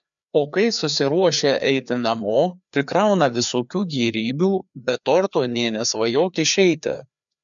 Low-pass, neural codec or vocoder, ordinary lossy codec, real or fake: 7.2 kHz; codec, 16 kHz, 2 kbps, FreqCodec, larger model; MP3, 96 kbps; fake